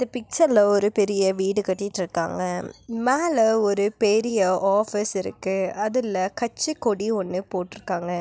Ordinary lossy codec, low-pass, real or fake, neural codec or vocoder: none; none; real; none